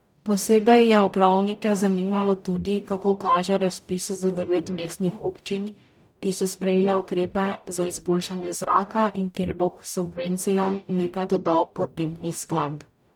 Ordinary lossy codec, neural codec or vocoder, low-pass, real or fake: none; codec, 44.1 kHz, 0.9 kbps, DAC; 19.8 kHz; fake